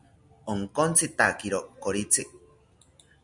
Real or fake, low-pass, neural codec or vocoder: real; 10.8 kHz; none